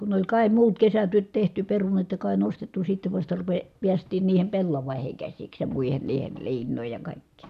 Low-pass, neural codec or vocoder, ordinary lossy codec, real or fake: 14.4 kHz; none; none; real